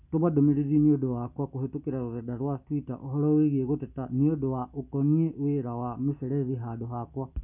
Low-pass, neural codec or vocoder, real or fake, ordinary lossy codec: 3.6 kHz; none; real; none